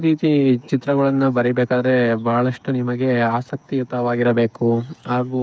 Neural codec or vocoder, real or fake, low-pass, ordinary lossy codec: codec, 16 kHz, 8 kbps, FreqCodec, smaller model; fake; none; none